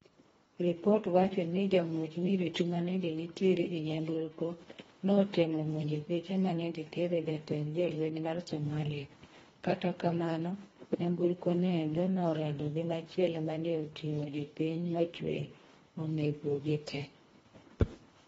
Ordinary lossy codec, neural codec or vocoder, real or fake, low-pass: AAC, 24 kbps; codec, 24 kHz, 1.5 kbps, HILCodec; fake; 10.8 kHz